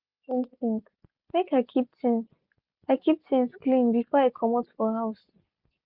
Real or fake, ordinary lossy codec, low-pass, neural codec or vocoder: real; Opus, 64 kbps; 5.4 kHz; none